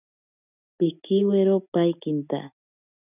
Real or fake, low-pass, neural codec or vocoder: real; 3.6 kHz; none